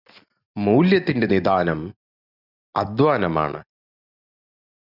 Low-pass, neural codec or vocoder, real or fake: 5.4 kHz; none; real